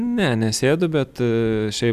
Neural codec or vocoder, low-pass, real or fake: none; 14.4 kHz; real